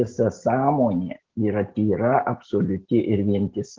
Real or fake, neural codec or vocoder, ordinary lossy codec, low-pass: real; none; Opus, 16 kbps; 7.2 kHz